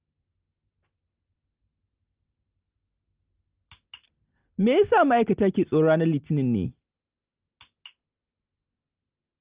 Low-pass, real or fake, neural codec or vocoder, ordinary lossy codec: 3.6 kHz; real; none; Opus, 32 kbps